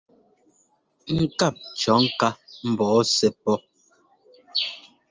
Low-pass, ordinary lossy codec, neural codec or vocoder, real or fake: 7.2 kHz; Opus, 24 kbps; none; real